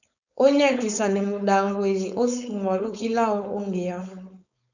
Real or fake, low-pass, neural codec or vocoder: fake; 7.2 kHz; codec, 16 kHz, 4.8 kbps, FACodec